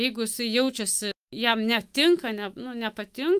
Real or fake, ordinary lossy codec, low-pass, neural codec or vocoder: fake; Opus, 32 kbps; 14.4 kHz; autoencoder, 48 kHz, 128 numbers a frame, DAC-VAE, trained on Japanese speech